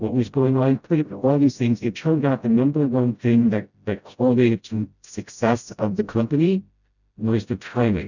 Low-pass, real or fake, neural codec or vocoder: 7.2 kHz; fake; codec, 16 kHz, 0.5 kbps, FreqCodec, smaller model